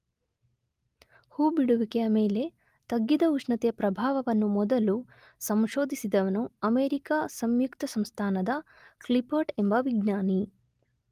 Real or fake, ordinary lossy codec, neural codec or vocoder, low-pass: real; Opus, 32 kbps; none; 14.4 kHz